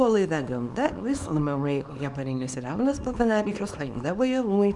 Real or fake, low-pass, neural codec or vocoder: fake; 10.8 kHz; codec, 24 kHz, 0.9 kbps, WavTokenizer, small release